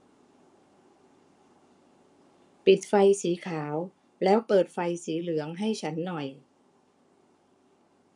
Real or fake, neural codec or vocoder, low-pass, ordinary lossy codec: fake; codec, 44.1 kHz, 7.8 kbps, Pupu-Codec; 10.8 kHz; none